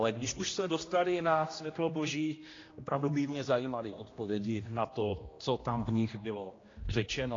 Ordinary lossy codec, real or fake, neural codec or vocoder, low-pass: AAC, 32 kbps; fake; codec, 16 kHz, 1 kbps, X-Codec, HuBERT features, trained on general audio; 7.2 kHz